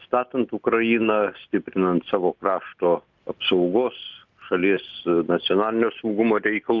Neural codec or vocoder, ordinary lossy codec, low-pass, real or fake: none; Opus, 32 kbps; 7.2 kHz; real